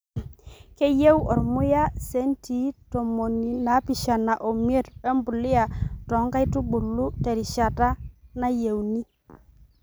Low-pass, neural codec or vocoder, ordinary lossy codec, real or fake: none; none; none; real